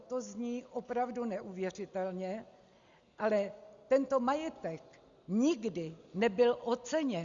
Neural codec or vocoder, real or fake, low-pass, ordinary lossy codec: none; real; 7.2 kHz; Opus, 64 kbps